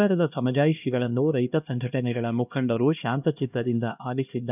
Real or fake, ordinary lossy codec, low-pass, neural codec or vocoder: fake; none; 3.6 kHz; codec, 16 kHz, 1 kbps, X-Codec, HuBERT features, trained on LibriSpeech